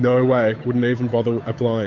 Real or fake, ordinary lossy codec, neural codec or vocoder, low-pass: fake; AAC, 48 kbps; codec, 16 kHz, 8 kbps, FunCodec, trained on Chinese and English, 25 frames a second; 7.2 kHz